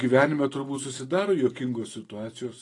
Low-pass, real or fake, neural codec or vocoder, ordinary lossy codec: 10.8 kHz; real; none; AAC, 32 kbps